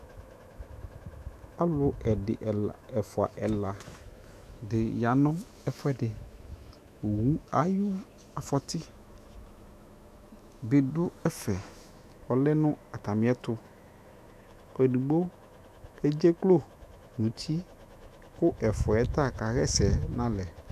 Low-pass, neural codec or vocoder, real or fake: 14.4 kHz; autoencoder, 48 kHz, 128 numbers a frame, DAC-VAE, trained on Japanese speech; fake